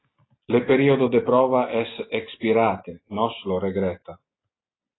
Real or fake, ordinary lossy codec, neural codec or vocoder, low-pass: real; AAC, 16 kbps; none; 7.2 kHz